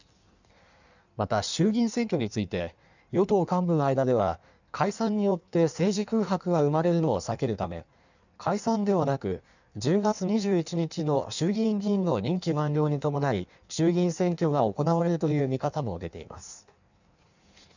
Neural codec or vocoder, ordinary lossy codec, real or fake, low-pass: codec, 16 kHz in and 24 kHz out, 1.1 kbps, FireRedTTS-2 codec; none; fake; 7.2 kHz